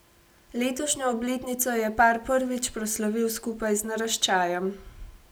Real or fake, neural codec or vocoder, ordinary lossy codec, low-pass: real; none; none; none